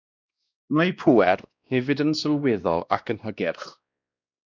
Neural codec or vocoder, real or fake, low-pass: codec, 16 kHz, 1 kbps, X-Codec, WavLM features, trained on Multilingual LibriSpeech; fake; 7.2 kHz